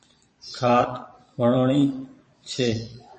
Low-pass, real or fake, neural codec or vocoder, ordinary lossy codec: 9.9 kHz; fake; vocoder, 22.05 kHz, 80 mel bands, Vocos; MP3, 32 kbps